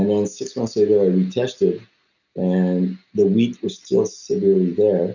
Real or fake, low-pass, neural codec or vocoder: real; 7.2 kHz; none